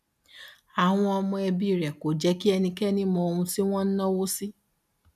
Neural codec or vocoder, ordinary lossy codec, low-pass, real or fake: none; none; 14.4 kHz; real